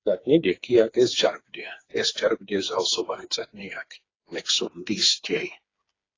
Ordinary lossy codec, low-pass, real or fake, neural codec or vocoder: AAC, 32 kbps; 7.2 kHz; fake; codec, 16 kHz, 4 kbps, FreqCodec, smaller model